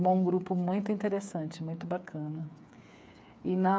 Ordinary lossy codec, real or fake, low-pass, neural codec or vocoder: none; fake; none; codec, 16 kHz, 8 kbps, FreqCodec, smaller model